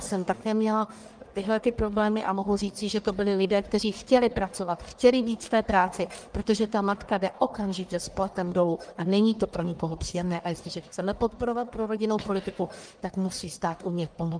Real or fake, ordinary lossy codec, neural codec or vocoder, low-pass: fake; Opus, 32 kbps; codec, 44.1 kHz, 1.7 kbps, Pupu-Codec; 9.9 kHz